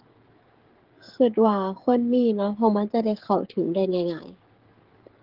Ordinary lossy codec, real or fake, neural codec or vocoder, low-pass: Opus, 16 kbps; fake; codec, 16 kHz, 16 kbps, FreqCodec, smaller model; 5.4 kHz